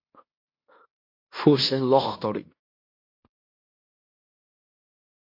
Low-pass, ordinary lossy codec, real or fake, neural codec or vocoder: 5.4 kHz; MP3, 32 kbps; fake; codec, 16 kHz in and 24 kHz out, 0.9 kbps, LongCat-Audio-Codec, fine tuned four codebook decoder